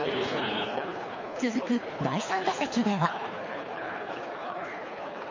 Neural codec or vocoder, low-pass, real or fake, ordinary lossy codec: codec, 24 kHz, 3 kbps, HILCodec; 7.2 kHz; fake; MP3, 32 kbps